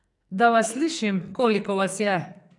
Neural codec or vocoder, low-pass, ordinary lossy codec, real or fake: codec, 44.1 kHz, 2.6 kbps, SNAC; 10.8 kHz; none; fake